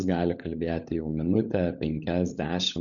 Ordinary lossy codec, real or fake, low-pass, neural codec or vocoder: MP3, 96 kbps; fake; 7.2 kHz; codec, 16 kHz, 16 kbps, FunCodec, trained on LibriTTS, 50 frames a second